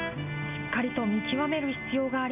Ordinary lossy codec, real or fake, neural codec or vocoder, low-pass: none; real; none; 3.6 kHz